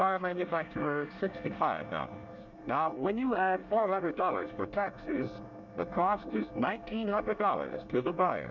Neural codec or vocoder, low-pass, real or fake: codec, 24 kHz, 1 kbps, SNAC; 7.2 kHz; fake